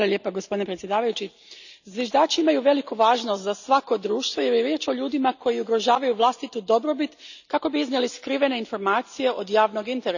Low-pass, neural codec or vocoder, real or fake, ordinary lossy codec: 7.2 kHz; none; real; none